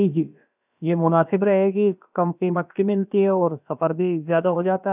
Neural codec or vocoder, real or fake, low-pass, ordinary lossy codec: codec, 16 kHz, about 1 kbps, DyCAST, with the encoder's durations; fake; 3.6 kHz; none